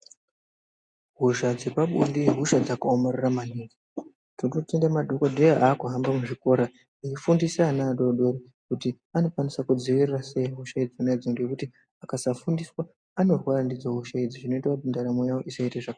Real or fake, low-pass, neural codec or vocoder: real; 9.9 kHz; none